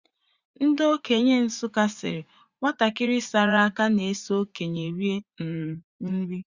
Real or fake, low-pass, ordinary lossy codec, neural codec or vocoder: fake; 7.2 kHz; Opus, 64 kbps; vocoder, 44.1 kHz, 80 mel bands, Vocos